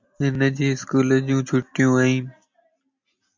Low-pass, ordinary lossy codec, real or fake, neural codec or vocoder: 7.2 kHz; MP3, 64 kbps; real; none